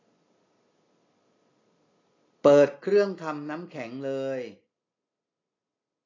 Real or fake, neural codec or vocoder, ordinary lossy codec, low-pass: real; none; AAC, 32 kbps; 7.2 kHz